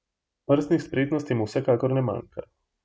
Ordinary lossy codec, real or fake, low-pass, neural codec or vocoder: none; real; none; none